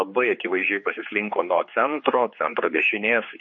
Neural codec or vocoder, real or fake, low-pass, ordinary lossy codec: codec, 16 kHz, 4 kbps, X-Codec, HuBERT features, trained on general audio; fake; 7.2 kHz; MP3, 32 kbps